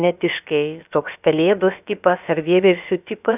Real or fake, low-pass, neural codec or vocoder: fake; 3.6 kHz; codec, 16 kHz, about 1 kbps, DyCAST, with the encoder's durations